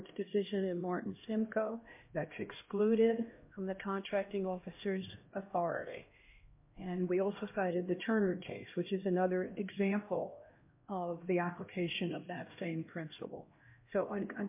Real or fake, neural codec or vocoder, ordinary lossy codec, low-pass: fake; codec, 16 kHz, 1 kbps, X-Codec, HuBERT features, trained on LibriSpeech; MP3, 24 kbps; 3.6 kHz